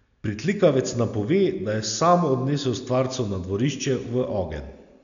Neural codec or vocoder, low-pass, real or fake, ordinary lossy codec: none; 7.2 kHz; real; none